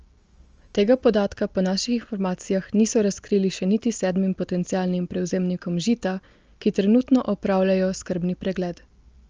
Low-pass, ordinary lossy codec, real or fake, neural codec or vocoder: 7.2 kHz; Opus, 24 kbps; real; none